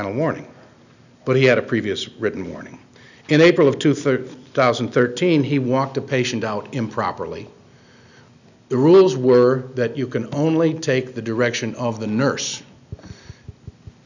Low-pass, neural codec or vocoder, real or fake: 7.2 kHz; none; real